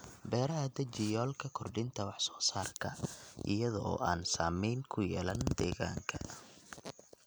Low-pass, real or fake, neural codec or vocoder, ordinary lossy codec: none; real; none; none